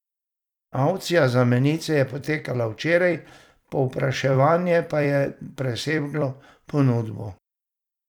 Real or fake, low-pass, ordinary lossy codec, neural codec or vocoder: fake; 19.8 kHz; none; vocoder, 44.1 kHz, 128 mel bands every 256 samples, BigVGAN v2